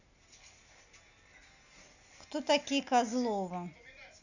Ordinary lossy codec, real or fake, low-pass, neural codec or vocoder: none; real; 7.2 kHz; none